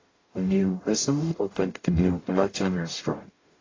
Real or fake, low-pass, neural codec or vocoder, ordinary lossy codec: fake; 7.2 kHz; codec, 44.1 kHz, 0.9 kbps, DAC; AAC, 32 kbps